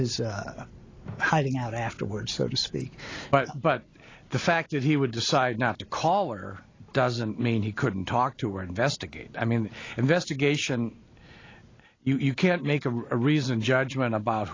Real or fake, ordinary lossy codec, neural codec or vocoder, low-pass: real; AAC, 32 kbps; none; 7.2 kHz